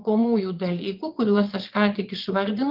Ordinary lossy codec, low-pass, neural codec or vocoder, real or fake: Opus, 32 kbps; 5.4 kHz; vocoder, 22.05 kHz, 80 mel bands, Vocos; fake